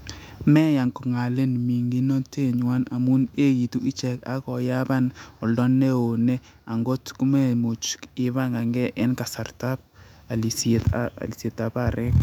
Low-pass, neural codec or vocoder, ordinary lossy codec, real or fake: 19.8 kHz; autoencoder, 48 kHz, 128 numbers a frame, DAC-VAE, trained on Japanese speech; none; fake